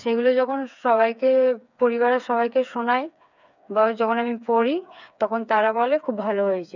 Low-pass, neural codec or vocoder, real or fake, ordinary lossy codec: 7.2 kHz; codec, 16 kHz, 4 kbps, FreqCodec, smaller model; fake; none